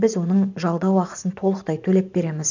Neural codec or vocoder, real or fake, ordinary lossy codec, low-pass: vocoder, 44.1 kHz, 128 mel bands every 512 samples, BigVGAN v2; fake; none; 7.2 kHz